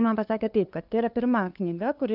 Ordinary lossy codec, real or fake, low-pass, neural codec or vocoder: Opus, 24 kbps; fake; 5.4 kHz; codec, 16 kHz, 2 kbps, FunCodec, trained on LibriTTS, 25 frames a second